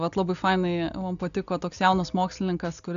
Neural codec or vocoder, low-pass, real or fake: none; 7.2 kHz; real